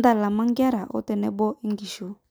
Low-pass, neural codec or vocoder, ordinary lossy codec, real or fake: none; none; none; real